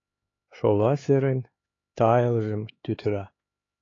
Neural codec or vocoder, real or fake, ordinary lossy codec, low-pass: codec, 16 kHz, 4 kbps, X-Codec, HuBERT features, trained on LibriSpeech; fake; AAC, 32 kbps; 7.2 kHz